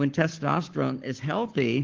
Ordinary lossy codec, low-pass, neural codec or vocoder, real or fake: Opus, 16 kbps; 7.2 kHz; codec, 44.1 kHz, 7.8 kbps, Pupu-Codec; fake